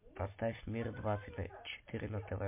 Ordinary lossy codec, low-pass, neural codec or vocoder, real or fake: MP3, 24 kbps; 3.6 kHz; none; real